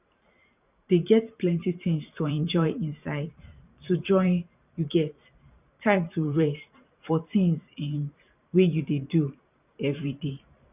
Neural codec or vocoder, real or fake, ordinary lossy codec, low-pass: vocoder, 44.1 kHz, 128 mel bands every 512 samples, BigVGAN v2; fake; none; 3.6 kHz